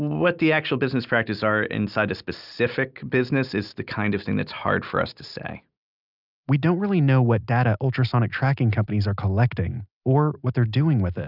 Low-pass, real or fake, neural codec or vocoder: 5.4 kHz; real; none